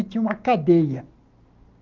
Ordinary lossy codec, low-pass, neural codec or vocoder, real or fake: Opus, 32 kbps; 7.2 kHz; none; real